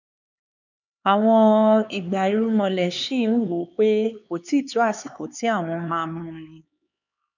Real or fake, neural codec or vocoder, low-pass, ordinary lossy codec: fake; codec, 16 kHz, 4 kbps, X-Codec, HuBERT features, trained on LibriSpeech; 7.2 kHz; none